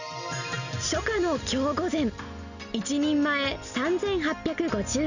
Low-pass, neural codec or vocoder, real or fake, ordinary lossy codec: 7.2 kHz; none; real; none